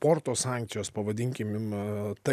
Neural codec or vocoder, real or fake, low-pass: none; real; 14.4 kHz